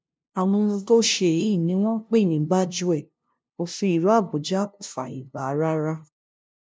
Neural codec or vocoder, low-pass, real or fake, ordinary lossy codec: codec, 16 kHz, 0.5 kbps, FunCodec, trained on LibriTTS, 25 frames a second; none; fake; none